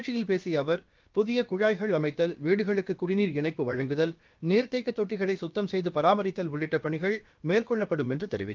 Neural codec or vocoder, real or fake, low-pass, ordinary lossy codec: codec, 16 kHz, about 1 kbps, DyCAST, with the encoder's durations; fake; 7.2 kHz; Opus, 24 kbps